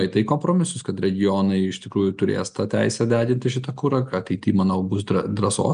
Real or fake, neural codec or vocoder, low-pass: real; none; 9.9 kHz